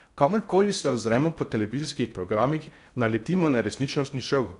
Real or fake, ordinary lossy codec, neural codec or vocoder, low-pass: fake; none; codec, 16 kHz in and 24 kHz out, 0.6 kbps, FocalCodec, streaming, 4096 codes; 10.8 kHz